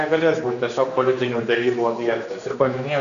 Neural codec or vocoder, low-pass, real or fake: codec, 16 kHz, 2 kbps, X-Codec, HuBERT features, trained on general audio; 7.2 kHz; fake